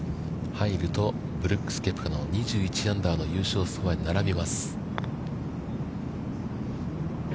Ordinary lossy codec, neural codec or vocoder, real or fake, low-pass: none; none; real; none